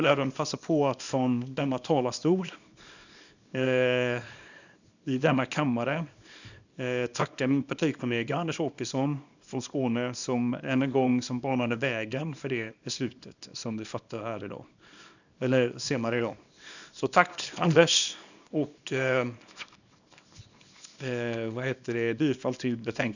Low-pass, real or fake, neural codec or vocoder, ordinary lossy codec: 7.2 kHz; fake; codec, 24 kHz, 0.9 kbps, WavTokenizer, small release; none